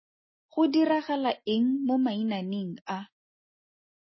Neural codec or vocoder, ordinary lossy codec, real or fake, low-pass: none; MP3, 24 kbps; real; 7.2 kHz